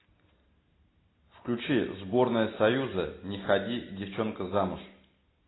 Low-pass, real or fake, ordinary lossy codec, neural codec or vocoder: 7.2 kHz; real; AAC, 16 kbps; none